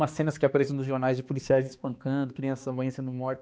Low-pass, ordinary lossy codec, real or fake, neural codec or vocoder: none; none; fake; codec, 16 kHz, 2 kbps, X-Codec, HuBERT features, trained on balanced general audio